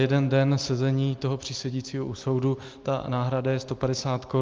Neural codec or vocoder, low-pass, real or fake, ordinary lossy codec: none; 7.2 kHz; real; Opus, 32 kbps